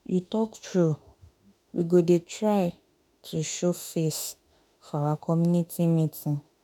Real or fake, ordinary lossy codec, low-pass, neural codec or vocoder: fake; none; none; autoencoder, 48 kHz, 32 numbers a frame, DAC-VAE, trained on Japanese speech